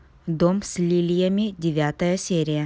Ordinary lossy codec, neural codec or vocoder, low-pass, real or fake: none; none; none; real